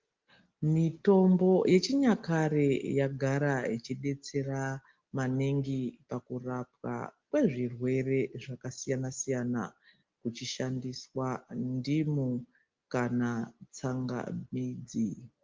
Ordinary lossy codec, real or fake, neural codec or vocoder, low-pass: Opus, 32 kbps; real; none; 7.2 kHz